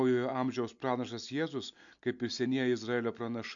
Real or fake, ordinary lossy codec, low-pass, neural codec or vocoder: real; MP3, 64 kbps; 7.2 kHz; none